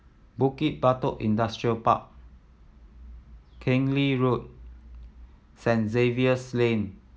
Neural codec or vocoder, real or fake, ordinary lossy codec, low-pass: none; real; none; none